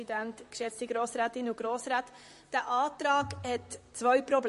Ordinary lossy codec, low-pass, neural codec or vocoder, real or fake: MP3, 48 kbps; 14.4 kHz; vocoder, 44.1 kHz, 128 mel bands, Pupu-Vocoder; fake